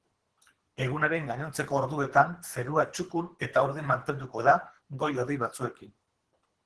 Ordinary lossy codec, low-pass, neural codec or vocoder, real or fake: Opus, 16 kbps; 10.8 kHz; codec, 24 kHz, 3 kbps, HILCodec; fake